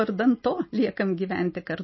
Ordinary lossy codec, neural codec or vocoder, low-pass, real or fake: MP3, 24 kbps; none; 7.2 kHz; real